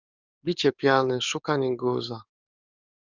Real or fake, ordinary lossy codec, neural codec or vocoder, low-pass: fake; Opus, 64 kbps; codec, 44.1 kHz, 7.8 kbps, DAC; 7.2 kHz